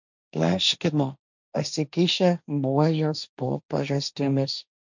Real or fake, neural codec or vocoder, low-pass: fake; codec, 16 kHz, 1.1 kbps, Voila-Tokenizer; 7.2 kHz